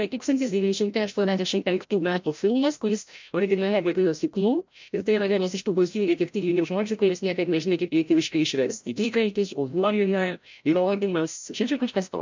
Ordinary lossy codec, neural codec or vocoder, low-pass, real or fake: MP3, 64 kbps; codec, 16 kHz, 0.5 kbps, FreqCodec, larger model; 7.2 kHz; fake